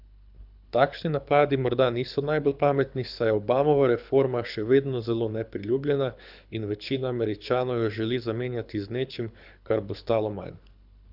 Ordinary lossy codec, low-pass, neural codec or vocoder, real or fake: none; 5.4 kHz; codec, 24 kHz, 6 kbps, HILCodec; fake